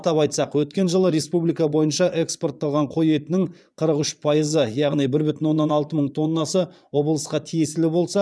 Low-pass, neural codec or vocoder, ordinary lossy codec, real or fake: none; vocoder, 22.05 kHz, 80 mel bands, Vocos; none; fake